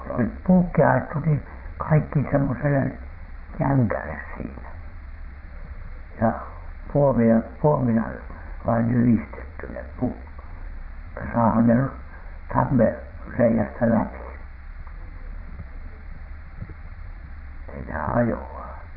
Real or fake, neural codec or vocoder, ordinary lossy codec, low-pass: fake; vocoder, 22.05 kHz, 80 mel bands, Vocos; none; 5.4 kHz